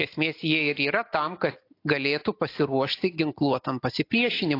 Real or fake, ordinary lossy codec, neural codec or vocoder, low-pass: real; AAC, 32 kbps; none; 5.4 kHz